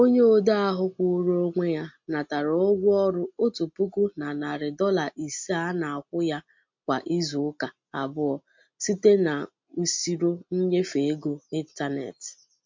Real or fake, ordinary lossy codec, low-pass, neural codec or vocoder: real; MP3, 48 kbps; 7.2 kHz; none